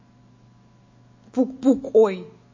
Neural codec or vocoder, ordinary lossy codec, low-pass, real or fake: autoencoder, 48 kHz, 128 numbers a frame, DAC-VAE, trained on Japanese speech; MP3, 32 kbps; 7.2 kHz; fake